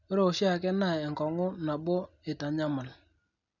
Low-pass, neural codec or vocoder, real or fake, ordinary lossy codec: 7.2 kHz; none; real; none